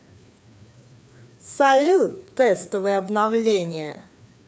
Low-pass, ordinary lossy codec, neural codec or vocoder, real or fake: none; none; codec, 16 kHz, 2 kbps, FreqCodec, larger model; fake